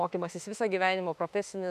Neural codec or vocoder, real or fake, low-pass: autoencoder, 48 kHz, 32 numbers a frame, DAC-VAE, trained on Japanese speech; fake; 14.4 kHz